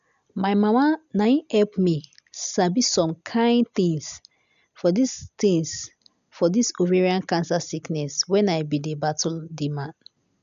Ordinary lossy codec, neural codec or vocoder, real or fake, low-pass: none; none; real; 7.2 kHz